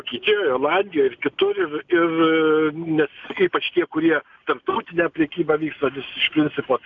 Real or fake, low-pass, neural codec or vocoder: real; 7.2 kHz; none